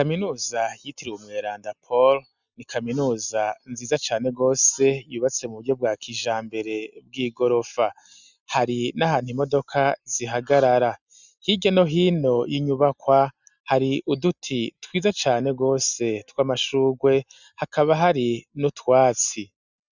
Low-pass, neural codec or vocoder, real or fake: 7.2 kHz; none; real